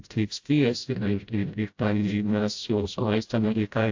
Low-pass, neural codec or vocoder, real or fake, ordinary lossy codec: 7.2 kHz; codec, 16 kHz, 0.5 kbps, FreqCodec, smaller model; fake; AAC, 48 kbps